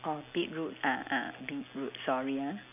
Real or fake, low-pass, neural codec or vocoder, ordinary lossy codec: real; 3.6 kHz; none; none